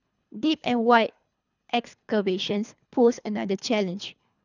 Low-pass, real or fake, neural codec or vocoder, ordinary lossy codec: 7.2 kHz; fake; codec, 24 kHz, 3 kbps, HILCodec; none